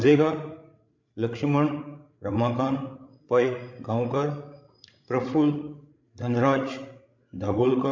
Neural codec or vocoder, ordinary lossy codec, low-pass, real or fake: codec, 16 kHz, 16 kbps, FreqCodec, larger model; MP3, 64 kbps; 7.2 kHz; fake